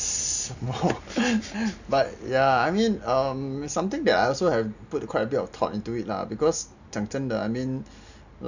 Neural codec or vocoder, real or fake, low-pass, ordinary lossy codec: none; real; 7.2 kHz; none